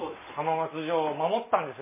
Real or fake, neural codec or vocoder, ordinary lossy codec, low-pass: real; none; MP3, 16 kbps; 3.6 kHz